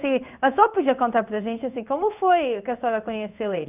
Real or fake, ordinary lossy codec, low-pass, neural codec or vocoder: fake; none; 3.6 kHz; codec, 16 kHz in and 24 kHz out, 1 kbps, XY-Tokenizer